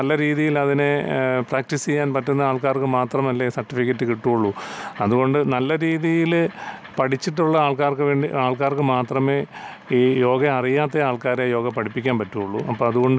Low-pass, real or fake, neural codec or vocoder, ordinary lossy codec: none; real; none; none